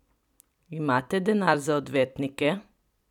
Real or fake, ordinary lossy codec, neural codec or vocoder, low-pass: fake; none; vocoder, 44.1 kHz, 128 mel bands every 256 samples, BigVGAN v2; 19.8 kHz